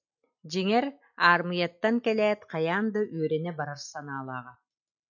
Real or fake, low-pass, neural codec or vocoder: real; 7.2 kHz; none